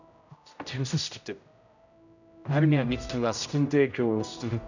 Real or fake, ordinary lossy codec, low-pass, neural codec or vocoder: fake; none; 7.2 kHz; codec, 16 kHz, 0.5 kbps, X-Codec, HuBERT features, trained on general audio